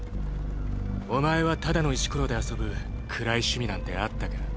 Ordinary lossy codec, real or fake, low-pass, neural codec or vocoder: none; real; none; none